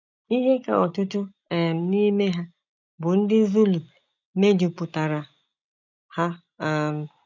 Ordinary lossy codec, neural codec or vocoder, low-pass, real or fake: none; none; 7.2 kHz; real